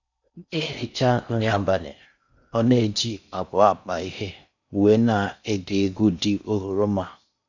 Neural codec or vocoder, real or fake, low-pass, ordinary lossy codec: codec, 16 kHz in and 24 kHz out, 0.6 kbps, FocalCodec, streaming, 4096 codes; fake; 7.2 kHz; none